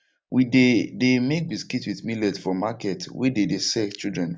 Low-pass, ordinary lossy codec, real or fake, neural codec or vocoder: none; none; real; none